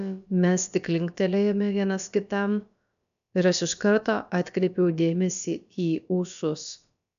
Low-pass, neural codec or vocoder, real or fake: 7.2 kHz; codec, 16 kHz, about 1 kbps, DyCAST, with the encoder's durations; fake